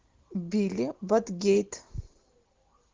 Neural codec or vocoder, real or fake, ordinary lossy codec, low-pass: none; real; Opus, 16 kbps; 7.2 kHz